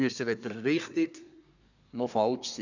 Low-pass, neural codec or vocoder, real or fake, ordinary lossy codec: 7.2 kHz; codec, 24 kHz, 1 kbps, SNAC; fake; none